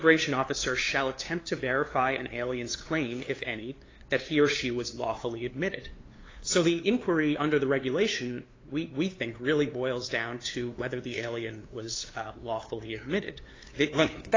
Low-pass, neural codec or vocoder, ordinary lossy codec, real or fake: 7.2 kHz; codec, 16 kHz, 2 kbps, FunCodec, trained on LibriTTS, 25 frames a second; AAC, 32 kbps; fake